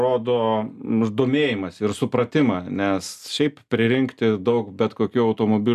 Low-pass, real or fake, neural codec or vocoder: 14.4 kHz; real; none